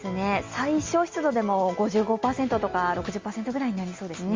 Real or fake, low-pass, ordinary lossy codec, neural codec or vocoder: real; 7.2 kHz; Opus, 32 kbps; none